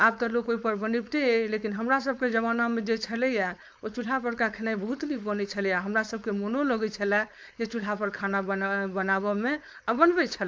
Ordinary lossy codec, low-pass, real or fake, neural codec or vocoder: none; none; fake; codec, 16 kHz, 4.8 kbps, FACodec